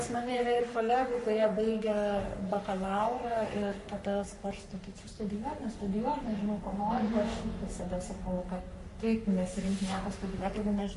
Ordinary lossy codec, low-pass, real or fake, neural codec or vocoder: MP3, 48 kbps; 14.4 kHz; fake; codec, 44.1 kHz, 3.4 kbps, Pupu-Codec